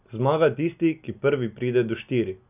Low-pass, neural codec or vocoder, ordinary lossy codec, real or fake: 3.6 kHz; none; none; real